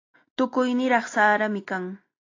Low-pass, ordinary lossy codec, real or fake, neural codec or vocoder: 7.2 kHz; AAC, 32 kbps; real; none